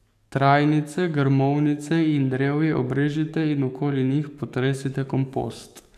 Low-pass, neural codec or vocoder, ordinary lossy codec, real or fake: 14.4 kHz; codec, 44.1 kHz, 7.8 kbps, DAC; none; fake